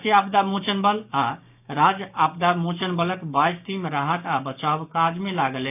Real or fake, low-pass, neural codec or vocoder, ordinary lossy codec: fake; 3.6 kHz; codec, 16 kHz, 6 kbps, DAC; none